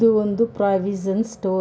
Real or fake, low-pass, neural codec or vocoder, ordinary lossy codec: real; none; none; none